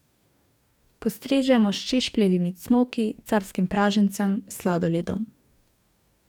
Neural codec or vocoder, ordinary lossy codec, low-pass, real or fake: codec, 44.1 kHz, 2.6 kbps, DAC; none; 19.8 kHz; fake